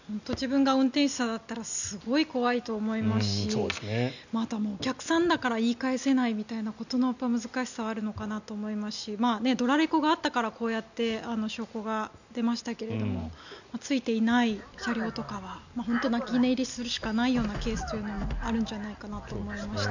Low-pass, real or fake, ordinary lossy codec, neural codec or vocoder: 7.2 kHz; real; none; none